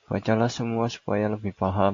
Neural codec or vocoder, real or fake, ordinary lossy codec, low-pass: none; real; AAC, 48 kbps; 7.2 kHz